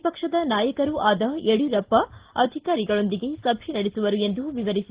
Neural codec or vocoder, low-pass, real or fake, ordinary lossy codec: none; 3.6 kHz; real; Opus, 16 kbps